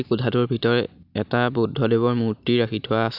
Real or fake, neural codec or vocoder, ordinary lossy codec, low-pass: real; none; none; 5.4 kHz